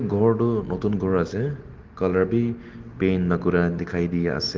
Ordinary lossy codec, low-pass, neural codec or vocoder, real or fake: Opus, 16 kbps; 7.2 kHz; none; real